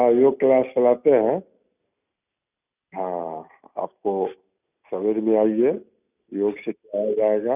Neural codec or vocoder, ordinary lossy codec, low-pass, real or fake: none; none; 3.6 kHz; real